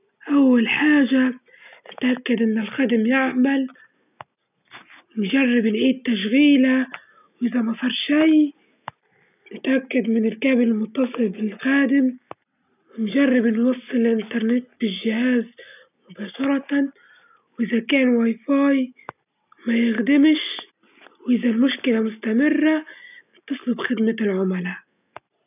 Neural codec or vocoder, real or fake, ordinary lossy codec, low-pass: none; real; none; 3.6 kHz